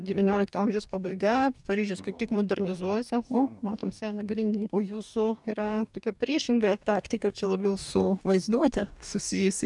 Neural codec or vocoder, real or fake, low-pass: codec, 44.1 kHz, 2.6 kbps, DAC; fake; 10.8 kHz